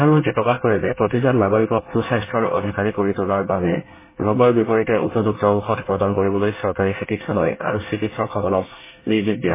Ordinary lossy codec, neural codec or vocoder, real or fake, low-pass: MP3, 16 kbps; codec, 24 kHz, 1 kbps, SNAC; fake; 3.6 kHz